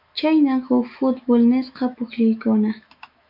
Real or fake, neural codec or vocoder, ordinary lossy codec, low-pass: fake; autoencoder, 48 kHz, 128 numbers a frame, DAC-VAE, trained on Japanese speech; MP3, 48 kbps; 5.4 kHz